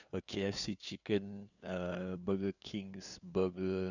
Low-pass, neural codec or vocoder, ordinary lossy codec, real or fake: 7.2 kHz; codec, 16 kHz, 2 kbps, FunCodec, trained on LibriTTS, 25 frames a second; none; fake